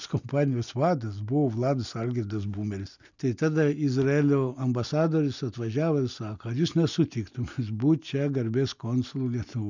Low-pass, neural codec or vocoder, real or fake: 7.2 kHz; none; real